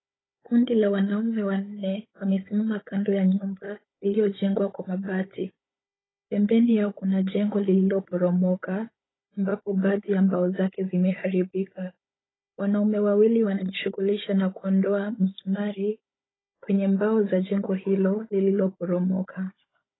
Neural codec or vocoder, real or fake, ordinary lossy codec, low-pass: codec, 16 kHz, 16 kbps, FunCodec, trained on Chinese and English, 50 frames a second; fake; AAC, 16 kbps; 7.2 kHz